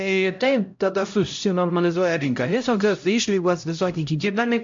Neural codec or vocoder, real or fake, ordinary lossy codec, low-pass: codec, 16 kHz, 0.5 kbps, X-Codec, HuBERT features, trained on LibriSpeech; fake; MP3, 48 kbps; 7.2 kHz